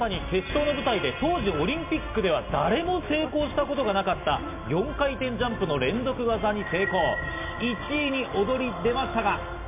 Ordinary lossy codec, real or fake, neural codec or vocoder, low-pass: MP3, 32 kbps; real; none; 3.6 kHz